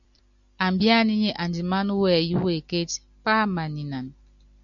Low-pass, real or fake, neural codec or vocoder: 7.2 kHz; real; none